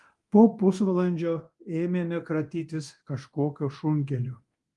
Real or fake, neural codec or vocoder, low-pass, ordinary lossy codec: fake; codec, 24 kHz, 0.9 kbps, DualCodec; 10.8 kHz; Opus, 24 kbps